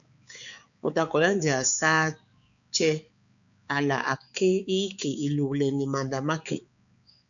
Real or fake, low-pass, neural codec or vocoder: fake; 7.2 kHz; codec, 16 kHz, 4 kbps, X-Codec, HuBERT features, trained on balanced general audio